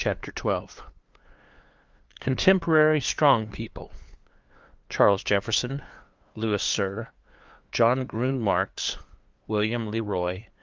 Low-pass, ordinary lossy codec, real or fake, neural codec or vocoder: 7.2 kHz; Opus, 32 kbps; fake; codec, 16 kHz, 2 kbps, FunCodec, trained on LibriTTS, 25 frames a second